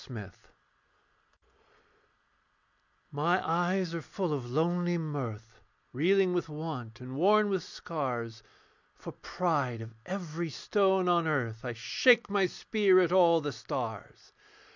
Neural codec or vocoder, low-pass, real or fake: none; 7.2 kHz; real